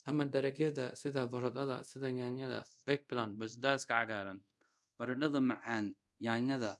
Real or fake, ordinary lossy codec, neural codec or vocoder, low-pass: fake; none; codec, 24 kHz, 0.5 kbps, DualCodec; none